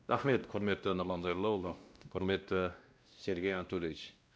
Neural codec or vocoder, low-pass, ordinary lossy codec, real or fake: codec, 16 kHz, 1 kbps, X-Codec, WavLM features, trained on Multilingual LibriSpeech; none; none; fake